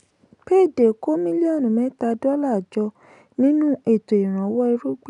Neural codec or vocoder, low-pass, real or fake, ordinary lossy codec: none; 10.8 kHz; real; none